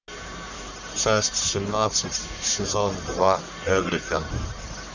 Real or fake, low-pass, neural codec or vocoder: fake; 7.2 kHz; codec, 44.1 kHz, 1.7 kbps, Pupu-Codec